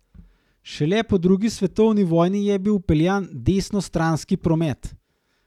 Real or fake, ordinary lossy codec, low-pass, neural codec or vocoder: real; none; 19.8 kHz; none